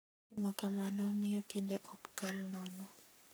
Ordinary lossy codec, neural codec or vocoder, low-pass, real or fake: none; codec, 44.1 kHz, 3.4 kbps, Pupu-Codec; none; fake